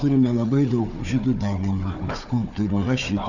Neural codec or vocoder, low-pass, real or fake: codec, 16 kHz, 4 kbps, FunCodec, trained on Chinese and English, 50 frames a second; 7.2 kHz; fake